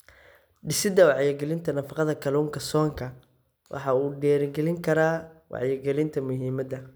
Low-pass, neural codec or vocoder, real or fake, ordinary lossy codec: none; none; real; none